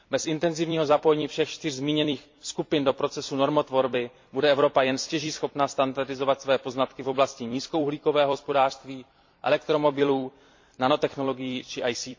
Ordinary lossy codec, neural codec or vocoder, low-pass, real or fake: MP3, 48 kbps; vocoder, 44.1 kHz, 128 mel bands every 256 samples, BigVGAN v2; 7.2 kHz; fake